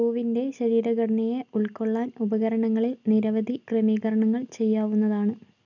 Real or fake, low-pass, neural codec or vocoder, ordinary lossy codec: real; 7.2 kHz; none; none